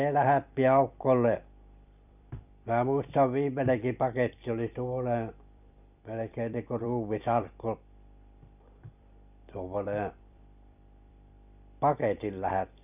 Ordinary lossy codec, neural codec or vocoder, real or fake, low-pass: none; vocoder, 44.1 kHz, 128 mel bands every 256 samples, BigVGAN v2; fake; 3.6 kHz